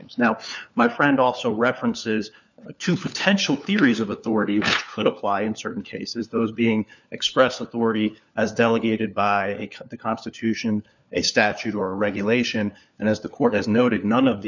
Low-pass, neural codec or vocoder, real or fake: 7.2 kHz; codec, 16 kHz, 4 kbps, FunCodec, trained on LibriTTS, 50 frames a second; fake